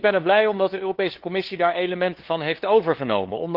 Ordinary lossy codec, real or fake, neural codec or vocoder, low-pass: Opus, 16 kbps; fake; codec, 16 kHz, 2 kbps, FunCodec, trained on LibriTTS, 25 frames a second; 5.4 kHz